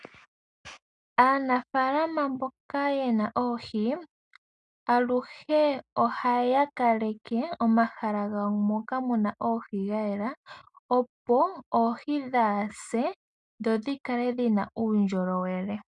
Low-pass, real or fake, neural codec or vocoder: 10.8 kHz; real; none